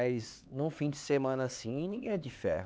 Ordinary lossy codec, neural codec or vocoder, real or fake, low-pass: none; codec, 16 kHz, 4 kbps, X-Codec, HuBERT features, trained on LibriSpeech; fake; none